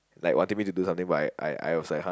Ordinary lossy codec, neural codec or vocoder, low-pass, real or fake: none; none; none; real